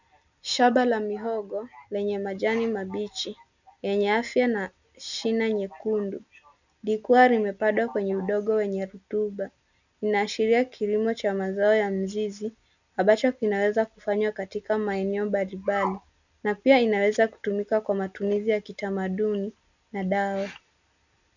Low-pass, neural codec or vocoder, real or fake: 7.2 kHz; none; real